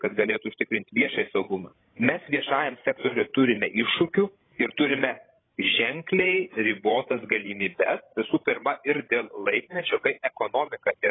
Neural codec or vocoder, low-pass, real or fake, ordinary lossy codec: codec, 16 kHz, 16 kbps, FreqCodec, larger model; 7.2 kHz; fake; AAC, 16 kbps